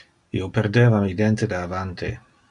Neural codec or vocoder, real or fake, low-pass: none; real; 10.8 kHz